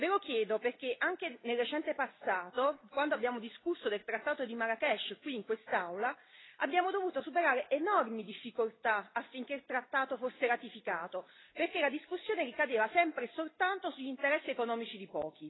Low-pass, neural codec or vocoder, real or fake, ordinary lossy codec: 7.2 kHz; none; real; AAC, 16 kbps